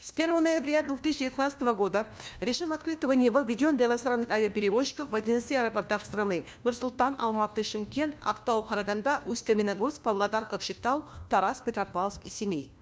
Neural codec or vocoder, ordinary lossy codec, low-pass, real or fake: codec, 16 kHz, 1 kbps, FunCodec, trained on LibriTTS, 50 frames a second; none; none; fake